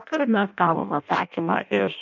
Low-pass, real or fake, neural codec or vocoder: 7.2 kHz; fake; codec, 16 kHz in and 24 kHz out, 0.6 kbps, FireRedTTS-2 codec